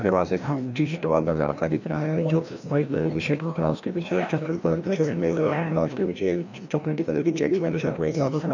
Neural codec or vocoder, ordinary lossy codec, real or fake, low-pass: codec, 16 kHz, 1 kbps, FreqCodec, larger model; none; fake; 7.2 kHz